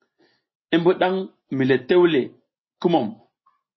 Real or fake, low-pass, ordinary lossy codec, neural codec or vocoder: real; 7.2 kHz; MP3, 24 kbps; none